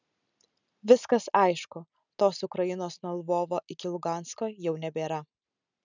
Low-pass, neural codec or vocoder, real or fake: 7.2 kHz; none; real